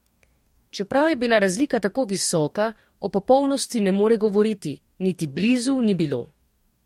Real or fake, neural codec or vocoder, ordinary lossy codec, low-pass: fake; codec, 44.1 kHz, 2.6 kbps, DAC; MP3, 64 kbps; 19.8 kHz